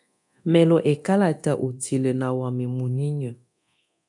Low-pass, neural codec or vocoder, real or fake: 10.8 kHz; codec, 24 kHz, 0.9 kbps, DualCodec; fake